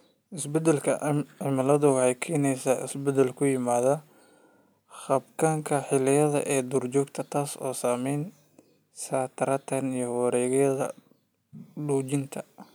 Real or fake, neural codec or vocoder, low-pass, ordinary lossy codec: real; none; none; none